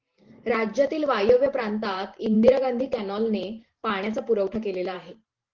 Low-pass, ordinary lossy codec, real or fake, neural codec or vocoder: 7.2 kHz; Opus, 16 kbps; fake; vocoder, 44.1 kHz, 128 mel bands every 512 samples, BigVGAN v2